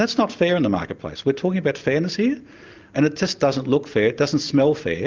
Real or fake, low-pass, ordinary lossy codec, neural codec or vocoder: real; 7.2 kHz; Opus, 24 kbps; none